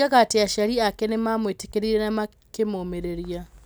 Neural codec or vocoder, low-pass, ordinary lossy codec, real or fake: none; none; none; real